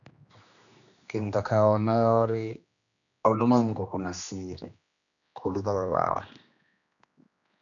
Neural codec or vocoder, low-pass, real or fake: codec, 16 kHz, 2 kbps, X-Codec, HuBERT features, trained on general audio; 7.2 kHz; fake